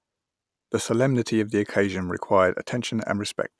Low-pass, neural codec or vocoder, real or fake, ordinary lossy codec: none; none; real; none